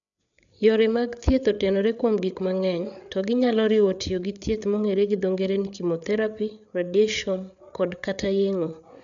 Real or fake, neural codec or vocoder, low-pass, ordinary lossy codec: fake; codec, 16 kHz, 8 kbps, FreqCodec, larger model; 7.2 kHz; none